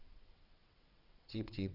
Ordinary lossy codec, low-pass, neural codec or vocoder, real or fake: Opus, 64 kbps; 5.4 kHz; vocoder, 22.05 kHz, 80 mel bands, WaveNeXt; fake